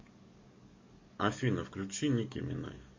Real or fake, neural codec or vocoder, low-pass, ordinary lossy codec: fake; codec, 44.1 kHz, 7.8 kbps, DAC; 7.2 kHz; MP3, 32 kbps